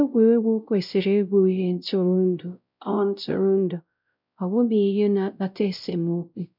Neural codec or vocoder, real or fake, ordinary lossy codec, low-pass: codec, 16 kHz, 0.5 kbps, X-Codec, WavLM features, trained on Multilingual LibriSpeech; fake; none; 5.4 kHz